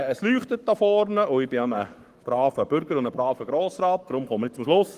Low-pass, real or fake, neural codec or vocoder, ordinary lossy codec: 14.4 kHz; fake; codec, 44.1 kHz, 7.8 kbps, Pupu-Codec; Opus, 24 kbps